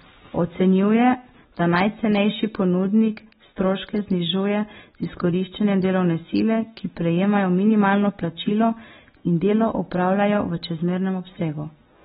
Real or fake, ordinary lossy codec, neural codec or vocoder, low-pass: real; AAC, 16 kbps; none; 19.8 kHz